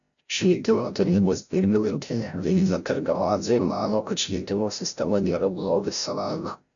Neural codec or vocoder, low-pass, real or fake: codec, 16 kHz, 0.5 kbps, FreqCodec, larger model; 7.2 kHz; fake